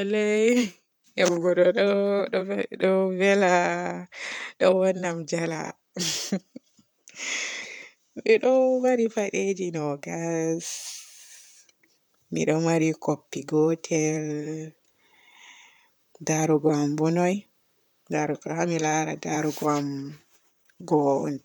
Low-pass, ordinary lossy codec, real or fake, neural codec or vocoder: none; none; fake; vocoder, 44.1 kHz, 128 mel bands, Pupu-Vocoder